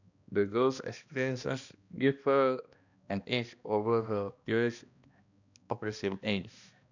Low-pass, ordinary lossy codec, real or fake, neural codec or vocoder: 7.2 kHz; none; fake; codec, 16 kHz, 1 kbps, X-Codec, HuBERT features, trained on balanced general audio